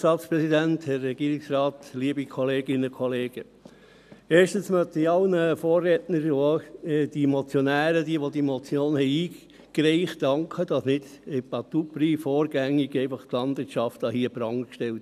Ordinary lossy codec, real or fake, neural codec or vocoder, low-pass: none; real; none; 14.4 kHz